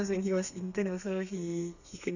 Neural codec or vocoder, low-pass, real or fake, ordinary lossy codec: codec, 32 kHz, 1.9 kbps, SNAC; 7.2 kHz; fake; none